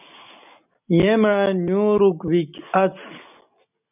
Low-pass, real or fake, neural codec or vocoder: 3.6 kHz; real; none